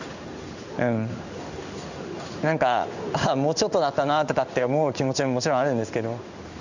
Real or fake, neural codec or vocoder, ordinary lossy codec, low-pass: fake; codec, 16 kHz in and 24 kHz out, 1 kbps, XY-Tokenizer; none; 7.2 kHz